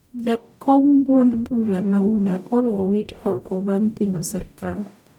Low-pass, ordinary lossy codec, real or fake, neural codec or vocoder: 19.8 kHz; none; fake; codec, 44.1 kHz, 0.9 kbps, DAC